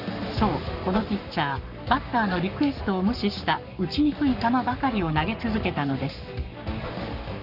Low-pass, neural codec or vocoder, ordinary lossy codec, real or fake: 5.4 kHz; codec, 44.1 kHz, 7.8 kbps, Pupu-Codec; none; fake